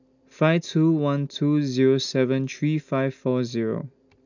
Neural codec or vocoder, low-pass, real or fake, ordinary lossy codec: none; 7.2 kHz; real; none